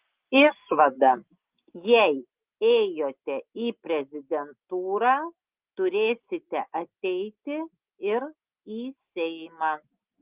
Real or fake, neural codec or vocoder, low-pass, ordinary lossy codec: real; none; 3.6 kHz; Opus, 32 kbps